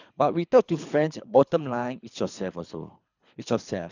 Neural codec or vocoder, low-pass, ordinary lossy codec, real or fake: codec, 24 kHz, 3 kbps, HILCodec; 7.2 kHz; none; fake